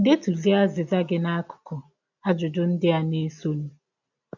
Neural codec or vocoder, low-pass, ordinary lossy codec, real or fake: none; 7.2 kHz; none; real